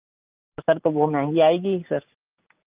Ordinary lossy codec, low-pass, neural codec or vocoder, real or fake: Opus, 24 kbps; 3.6 kHz; none; real